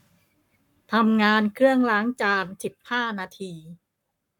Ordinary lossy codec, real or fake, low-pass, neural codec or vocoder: none; fake; 19.8 kHz; codec, 44.1 kHz, 7.8 kbps, Pupu-Codec